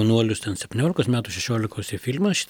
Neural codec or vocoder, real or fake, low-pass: none; real; 19.8 kHz